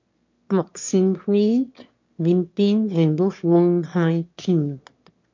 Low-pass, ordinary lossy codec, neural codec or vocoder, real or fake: 7.2 kHz; MP3, 48 kbps; autoencoder, 22.05 kHz, a latent of 192 numbers a frame, VITS, trained on one speaker; fake